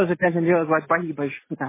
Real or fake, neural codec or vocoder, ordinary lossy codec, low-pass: real; none; MP3, 16 kbps; 3.6 kHz